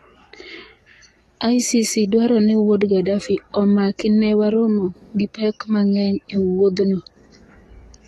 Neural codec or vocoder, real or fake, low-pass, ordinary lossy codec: codec, 44.1 kHz, 7.8 kbps, DAC; fake; 19.8 kHz; AAC, 32 kbps